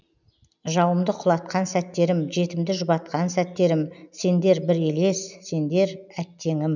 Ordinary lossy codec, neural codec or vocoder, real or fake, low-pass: none; none; real; 7.2 kHz